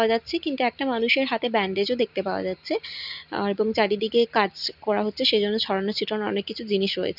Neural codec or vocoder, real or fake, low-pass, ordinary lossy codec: none; real; 5.4 kHz; none